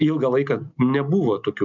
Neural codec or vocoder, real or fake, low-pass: none; real; 7.2 kHz